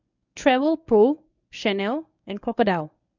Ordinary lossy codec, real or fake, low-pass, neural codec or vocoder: none; fake; 7.2 kHz; codec, 24 kHz, 0.9 kbps, WavTokenizer, medium speech release version 1